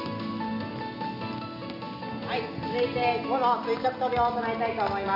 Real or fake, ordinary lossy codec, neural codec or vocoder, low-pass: real; none; none; 5.4 kHz